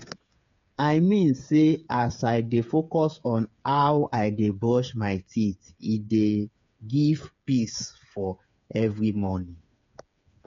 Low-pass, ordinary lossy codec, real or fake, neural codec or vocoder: 7.2 kHz; MP3, 48 kbps; fake; codec, 16 kHz, 8 kbps, FreqCodec, smaller model